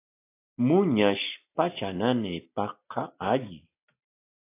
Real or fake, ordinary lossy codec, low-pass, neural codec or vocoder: real; AAC, 24 kbps; 3.6 kHz; none